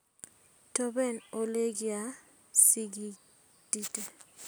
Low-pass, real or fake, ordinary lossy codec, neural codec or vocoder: none; real; none; none